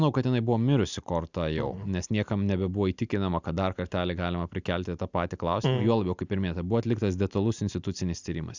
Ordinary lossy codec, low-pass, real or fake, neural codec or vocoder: Opus, 64 kbps; 7.2 kHz; real; none